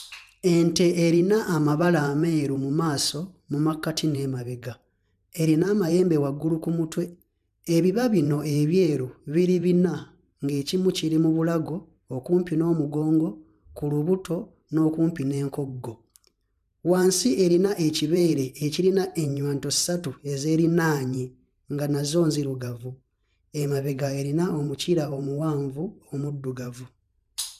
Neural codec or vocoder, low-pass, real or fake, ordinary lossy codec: vocoder, 44.1 kHz, 128 mel bands every 512 samples, BigVGAN v2; 14.4 kHz; fake; none